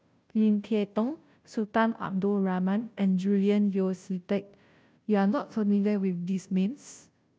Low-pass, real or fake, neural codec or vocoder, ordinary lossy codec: none; fake; codec, 16 kHz, 0.5 kbps, FunCodec, trained on Chinese and English, 25 frames a second; none